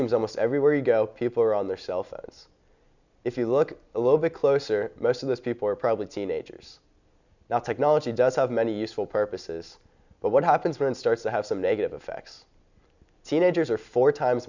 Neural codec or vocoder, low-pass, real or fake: none; 7.2 kHz; real